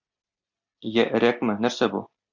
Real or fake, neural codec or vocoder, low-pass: real; none; 7.2 kHz